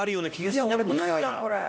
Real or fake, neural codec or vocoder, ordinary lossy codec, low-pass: fake; codec, 16 kHz, 1 kbps, X-Codec, HuBERT features, trained on LibriSpeech; none; none